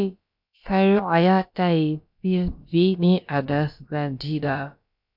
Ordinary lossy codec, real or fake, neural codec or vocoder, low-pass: MP3, 48 kbps; fake; codec, 16 kHz, about 1 kbps, DyCAST, with the encoder's durations; 5.4 kHz